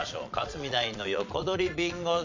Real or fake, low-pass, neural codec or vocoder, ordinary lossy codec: fake; 7.2 kHz; vocoder, 22.05 kHz, 80 mel bands, Vocos; MP3, 64 kbps